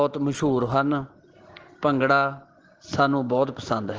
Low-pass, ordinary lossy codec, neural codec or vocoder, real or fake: 7.2 kHz; Opus, 16 kbps; none; real